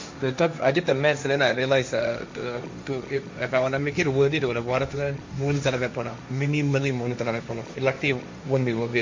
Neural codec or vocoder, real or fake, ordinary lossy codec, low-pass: codec, 16 kHz, 1.1 kbps, Voila-Tokenizer; fake; MP3, 48 kbps; 7.2 kHz